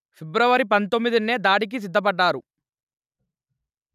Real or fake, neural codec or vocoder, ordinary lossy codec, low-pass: real; none; none; 14.4 kHz